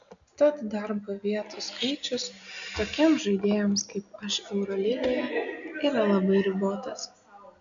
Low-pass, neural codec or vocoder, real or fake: 7.2 kHz; none; real